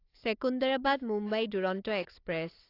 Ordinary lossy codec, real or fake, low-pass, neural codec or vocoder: AAC, 32 kbps; fake; 5.4 kHz; codec, 16 kHz, 4 kbps, FunCodec, trained on Chinese and English, 50 frames a second